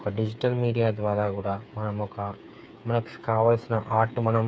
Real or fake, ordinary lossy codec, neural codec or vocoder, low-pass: fake; none; codec, 16 kHz, 8 kbps, FreqCodec, smaller model; none